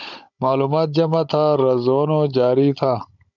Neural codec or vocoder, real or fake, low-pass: codec, 16 kHz, 16 kbps, FunCodec, trained on Chinese and English, 50 frames a second; fake; 7.2 kHz